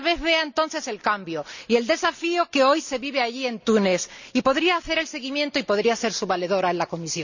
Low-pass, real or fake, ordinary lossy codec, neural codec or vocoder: 7.2 kHz; real; none; none